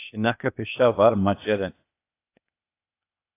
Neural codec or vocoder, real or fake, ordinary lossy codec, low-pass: codec, 16 kHz, 0.8 kbps, ZipCodec; fake; AAC, 24 kbps; 3.6 kHz